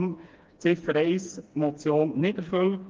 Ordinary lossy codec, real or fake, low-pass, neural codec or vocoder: Opus, 32 kbps; fake; 7.2 kHz; codec, 16 kHz, 2 kbps, FreqCodec, smaller model